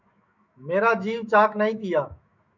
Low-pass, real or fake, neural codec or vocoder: 7.2 kHz; fake; autoencoder, 48 kHz, 128 numbers a frame, DAC-VAE, trained on Japanese speech